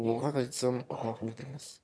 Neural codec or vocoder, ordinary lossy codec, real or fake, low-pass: autoencoder, 22.05 kHz, a latent of 192 numbers a frame, VITS, trained on one speaker; none; fake; none